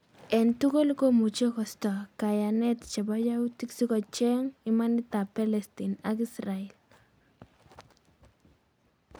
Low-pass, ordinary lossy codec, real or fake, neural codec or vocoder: none; none; real; none